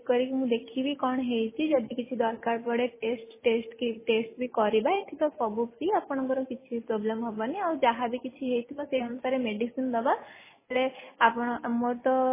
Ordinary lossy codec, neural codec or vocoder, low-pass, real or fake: MP3, 16 kbps; none; 3.6 kHz; real